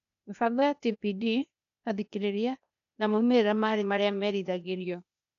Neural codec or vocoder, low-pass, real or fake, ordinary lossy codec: codec, 16 kHz, 0.8 kbps, ZipCodec; 7.2 kHz; fake; none